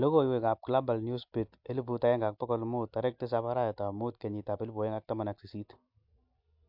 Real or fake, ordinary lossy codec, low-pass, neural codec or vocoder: real; MP3, 48 kbps; 5.4 kHz; none